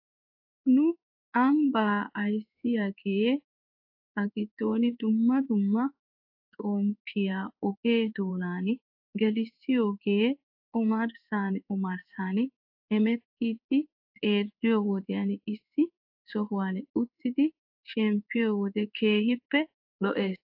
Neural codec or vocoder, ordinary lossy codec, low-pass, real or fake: codec, 16 kHz in and 24 kHz out, 1 kbps, XY-Tokenizer; AAC, 48 kbps; 5.4 kHz; fake